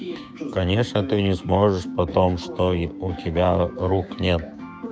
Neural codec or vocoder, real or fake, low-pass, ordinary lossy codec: codec, 16 kHz, 6 kbps, DAC; fake; none; none